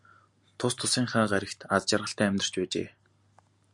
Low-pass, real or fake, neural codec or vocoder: 10.8 kHz; real; none